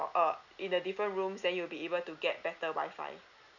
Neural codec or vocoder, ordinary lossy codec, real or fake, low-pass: none; none; real; 7.2 kHz